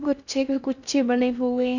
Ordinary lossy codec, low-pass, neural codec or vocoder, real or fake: Opus, 64 kbps; 7.2 kHz; codec, 16 kHz in and 24 kHz out, 0.6 kbps, FocalCodec, streaming, 4096 codes; fake